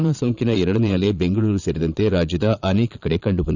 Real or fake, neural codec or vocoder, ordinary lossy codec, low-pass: fake; vocoder, 22.05 kHz, 80 mel bands, Vocos; none; 7.2 kHz